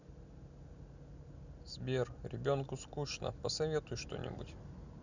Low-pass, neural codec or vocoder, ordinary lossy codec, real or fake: 7.2 kHz; none; none; real